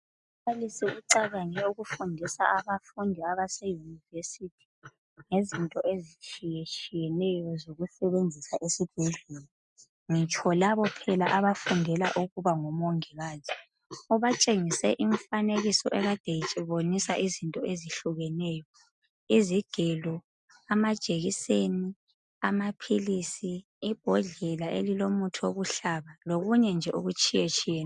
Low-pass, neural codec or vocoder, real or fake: 10.8 kHz; none; real